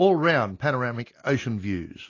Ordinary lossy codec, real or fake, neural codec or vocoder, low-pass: AAC, 32 kbps; real; none; 7.2 kHz